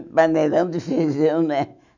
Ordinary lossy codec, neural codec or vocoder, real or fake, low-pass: none; autoencoder, 48 kHz, 128 numbers a frame, DAC-VAE, trained on Japanese speech; fake; 7.2 kHz